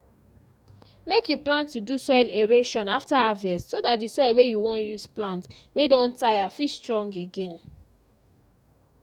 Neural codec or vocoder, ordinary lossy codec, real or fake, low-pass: codec, 44.1 kHz, 2.6 kbps, DAC; none; fake; 19.8 kHz